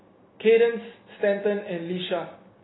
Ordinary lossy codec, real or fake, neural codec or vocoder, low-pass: AAC, 16 kbps; real; none; 7.2 kHz